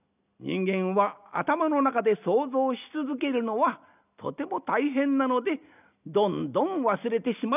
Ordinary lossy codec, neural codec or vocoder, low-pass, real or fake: none; none; 3.6 kHz; real